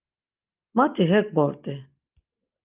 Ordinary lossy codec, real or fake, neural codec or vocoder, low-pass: Opus, 32 kbps; real; none; 3.6 kHz